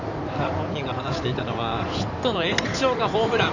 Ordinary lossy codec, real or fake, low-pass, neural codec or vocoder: none; fake; 7.2 kHz; codec, 16 kHz in and 24 kHz out, 2.2 kbps, FireRedTTS-2 codec